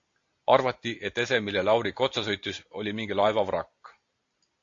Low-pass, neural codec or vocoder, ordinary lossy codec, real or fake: 7.2 kHz; none; AAC, 48 kbps; real